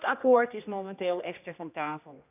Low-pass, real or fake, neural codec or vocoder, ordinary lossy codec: 3.6 kHz; fake; codec, 16 kHz, 1 kbps, X-Codec, HuBERT features, trained on general audio; none